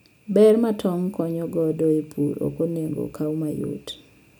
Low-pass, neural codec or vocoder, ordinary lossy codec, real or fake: none; none; none; real